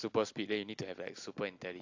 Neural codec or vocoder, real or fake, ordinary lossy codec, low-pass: none; real; AAC, 48 kbps; 7.2 kHz